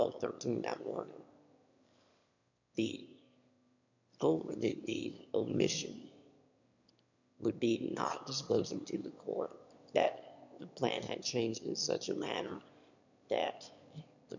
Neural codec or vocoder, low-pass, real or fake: autoencoder, 22.05 kHz, a latent of 192 numbers a frame, VITS, trained on one speaker; 7.2 kHz; fake